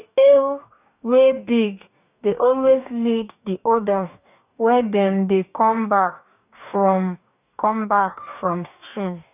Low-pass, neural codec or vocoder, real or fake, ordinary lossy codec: 3.6 kHz; codec, 44.1 kHz, 2.6 kbps, DAC; fake; none